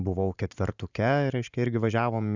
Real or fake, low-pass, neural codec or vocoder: fake; 7.2 kHz; vocoder, 44.1 kHz, 128 mel bands every 512 samples, BigVGAN v2